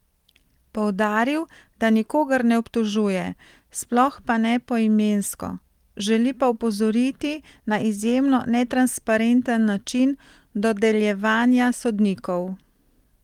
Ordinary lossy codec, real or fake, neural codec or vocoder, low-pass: Opus, 24 kbps; real; none; 19.8 kHz